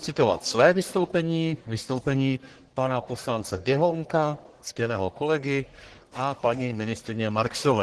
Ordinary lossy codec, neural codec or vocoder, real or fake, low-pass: Opus, 16 kbps; codec, 44.1 kHz, 1.7 kbps, Pupu-Codec; fake; 10.8 kHz